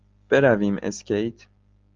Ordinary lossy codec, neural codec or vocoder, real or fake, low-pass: Opus, 32 kbps; none; real; 7.2 kHz